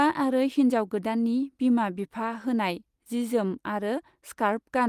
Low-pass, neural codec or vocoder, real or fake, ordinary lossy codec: 14.4 kHz; none; real; Opus, 32 kbps